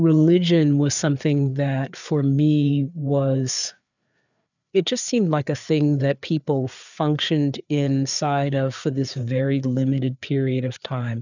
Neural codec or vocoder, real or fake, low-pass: codec, 16 kHz, 4 kbps, FreqCodec, larger model; fake; 7.2 kHz